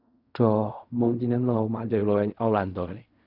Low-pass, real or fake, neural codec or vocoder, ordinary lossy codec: 5.4 kHz; fake; codec, 16 kHz in and 24 kHz out, 0.4 kbps, LongCat-Audio-Codec, fine tuned four codebook decoder; none